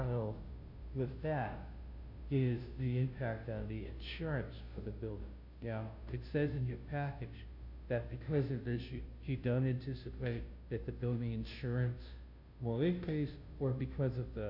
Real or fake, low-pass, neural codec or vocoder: fake; 5.4 kHz; codec, 16 kHz, 0.5 kbps, FunCodec, trained on Chinese and English, 25 frames a second